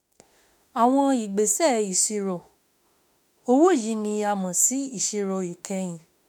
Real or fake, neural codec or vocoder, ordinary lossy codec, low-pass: fake; autoencoder, 48 kHz, 32 numbers a frame, DAC-VAE, trained on Japanese speech; none; none